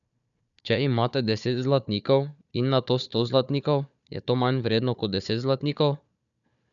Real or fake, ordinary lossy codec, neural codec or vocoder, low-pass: fake; none; codec, 16 kHz, 4 kbps, FunCodec, trained on Chinese and English, 50 frames a second; 7.2 kHz